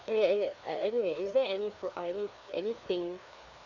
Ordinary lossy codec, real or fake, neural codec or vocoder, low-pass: none; fake; codec, 16 kHz, 2 kbps, FreqCodec, larger model; 7.2 kHz